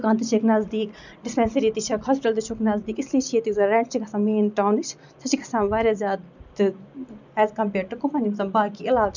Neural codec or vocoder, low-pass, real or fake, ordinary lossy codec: none; 7.2 kHz; real; none